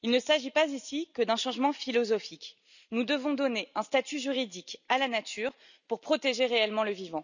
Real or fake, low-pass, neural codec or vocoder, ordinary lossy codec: real; 7.2 kHz; none; none